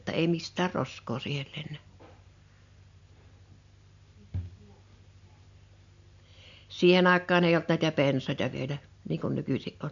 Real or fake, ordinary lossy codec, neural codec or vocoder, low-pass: real; MP3, 64 kbps; none; 7.2 kHz